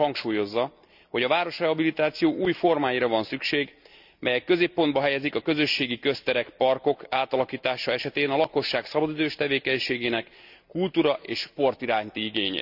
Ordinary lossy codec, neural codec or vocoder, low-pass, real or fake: none; none; 5.4 kHz; real